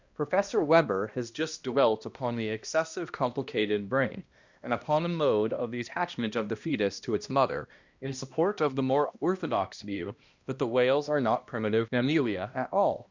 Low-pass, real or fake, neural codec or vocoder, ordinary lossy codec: 7.2 kHz; fake; codec, 16 kHz, 1 kbps, X-Codec, HuBERT features, trained on balanced general audio; Opus, 64 kbps